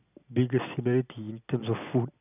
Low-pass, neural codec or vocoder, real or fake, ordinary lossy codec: 3.6 kHz; none; real; none